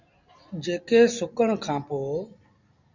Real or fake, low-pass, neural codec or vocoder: real; 7.2 kHz; none